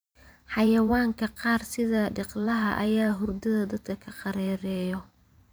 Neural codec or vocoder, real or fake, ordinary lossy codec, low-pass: vocoder, 44.1 kHz, 128 mel bands every 512 samples, BigVGAN v2; fake; none; none